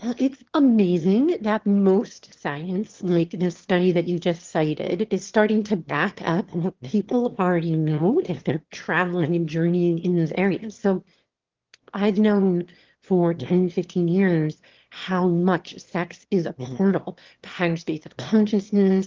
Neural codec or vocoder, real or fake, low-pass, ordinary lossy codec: autoencoder, 22.05 kHz, a latent of 192 numbers a frame, VITS, trained on one speaker; fake; 7.2 kHz; Opus, 16 kbps